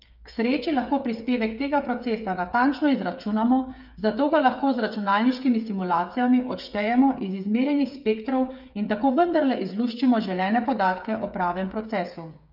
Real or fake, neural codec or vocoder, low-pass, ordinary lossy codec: fake; codec, 16 kHz, 8 kbps, FreqCodec, smaller model; 5.4 kHz; none